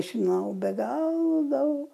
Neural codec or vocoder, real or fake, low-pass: none; real; 14.4 kHz